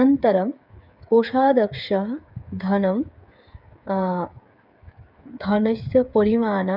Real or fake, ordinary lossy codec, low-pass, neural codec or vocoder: fake; none; 5.4 kHz; codec, 16 kHz, 8 kbps, FreqCodec, smaller model